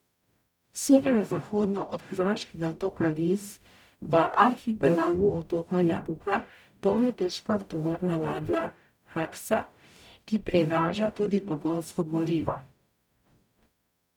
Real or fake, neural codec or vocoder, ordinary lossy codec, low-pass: fake; codec, 44.1 kHz, 0.9 kbps, DAC; none; 19.8 kHz